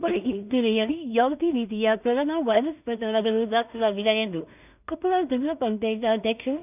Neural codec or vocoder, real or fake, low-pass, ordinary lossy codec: codec, 16 kHz in and 24 kHz out, 0.4 kbps, LongCat-Audio-Codec, two codebook decoder; fake; 3.6 kHz; none